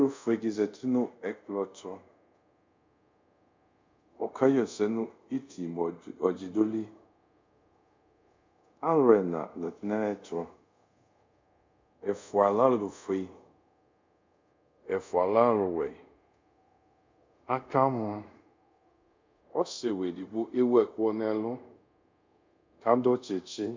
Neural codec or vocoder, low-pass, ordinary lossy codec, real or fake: codec, 24 kHz, 0.5 kbps, DualCodec; 7.2 kHz; MP3, 64 kbps; fake